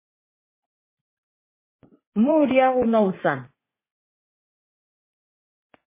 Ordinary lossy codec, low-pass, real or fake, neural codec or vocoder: MP3, 16 kbps; 3.6 kHz; fake; vocoder, 44.1 kHz, 80 mel bands, Vocos